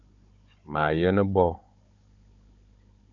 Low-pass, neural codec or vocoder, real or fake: 7.2 kHz; codec, 16 kHz, 16 kbps, FunCodec, trained on Chinese and English, 50 frames a second; fake